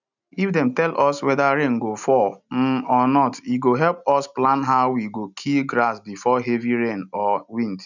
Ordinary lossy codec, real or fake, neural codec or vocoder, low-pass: none; real; none; 7.2 kHz